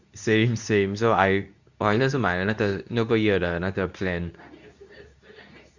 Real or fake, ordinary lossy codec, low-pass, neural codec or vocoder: fake; none; 7.2 kHz; codec, 24 kHz, 0.9 kbps, WavTokenizer, medium speech release version 2